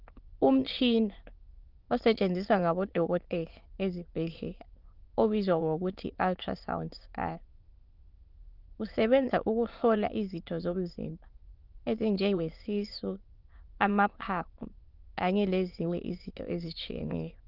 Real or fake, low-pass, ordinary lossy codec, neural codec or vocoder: fake; 5.4 kHz; Opus, 32 kbps; autoencoder, 22.05 kHz, a latent of 192 numbers a frame, VITS, trained on many speakers